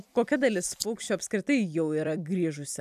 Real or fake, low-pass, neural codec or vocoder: fake; 14.4 kHz; vocoder, 44.1 kHz, 128 mel bands every 256 samples, BigVGAN v2